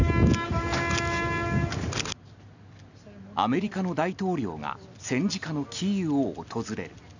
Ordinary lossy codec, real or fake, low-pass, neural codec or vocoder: none; real; 7.2 kHz; none